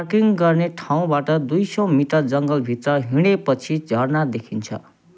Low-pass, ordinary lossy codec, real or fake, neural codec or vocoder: none; none; real; none